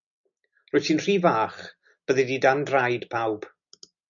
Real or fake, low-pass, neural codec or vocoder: real; 7.2 kHz; none